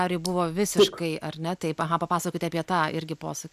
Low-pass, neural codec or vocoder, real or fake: 14.4 kHz; none; real